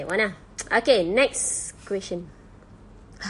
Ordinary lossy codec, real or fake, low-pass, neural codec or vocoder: MP3, 48 kbps; real; 14.4 kHz; none